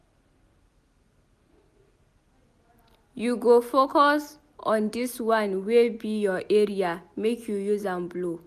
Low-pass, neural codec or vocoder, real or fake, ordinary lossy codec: 14.4 kHz; none; real; none